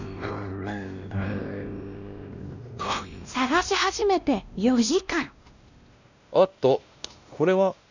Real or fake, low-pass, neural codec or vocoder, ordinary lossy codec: fake; 7.2 kHz; codec, 16 kHz, 1 kbps, X-Codec, WavLM features, trained on Multilingual LibriSpeech; none